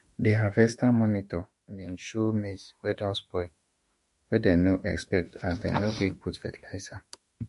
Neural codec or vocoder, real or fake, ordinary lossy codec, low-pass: autoencoder, 48 kHz, 32 numbers a frame, DAC-VAE, trained on Japanese speech; fake; MP3, 48 kbps; 14.4 kHz